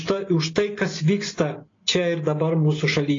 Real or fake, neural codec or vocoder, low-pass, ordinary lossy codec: real; none; 7.2 kHz; AAC, 32 kbps